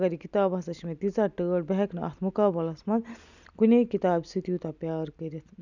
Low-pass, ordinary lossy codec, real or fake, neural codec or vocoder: 7.2 kHz; none; real; none